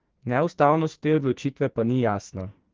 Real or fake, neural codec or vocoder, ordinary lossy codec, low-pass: fake; codec, 44.1 kHz, 2.6 kbps, SNAC; Opus, 16 kbps; 7.2 kHz